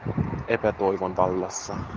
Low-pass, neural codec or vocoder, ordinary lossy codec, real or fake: 7.2 kHz; none; Opus, 32 kbps; real